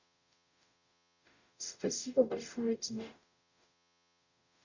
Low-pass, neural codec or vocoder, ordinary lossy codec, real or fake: 7.2 kHz; codec, 44.1 kHz, 0.9 kbps, DAC; none; fake